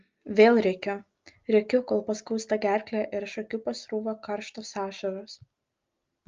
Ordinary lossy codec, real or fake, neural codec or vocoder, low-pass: Opus, 32 kbps; real; none; 7.2 kHz